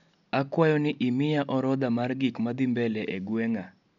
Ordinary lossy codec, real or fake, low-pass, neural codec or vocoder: none; real; 7.2 kHz; none